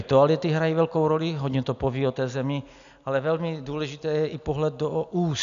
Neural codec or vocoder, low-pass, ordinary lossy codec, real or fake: none; 7.2 kHz; MP3, 96 kbps; real